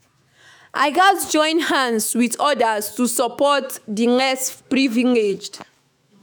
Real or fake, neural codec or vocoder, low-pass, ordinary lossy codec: fake; autoencoder, 48 kHz, 128 numbers a frame, DAC-VAE, trained on Japanese speech; none; none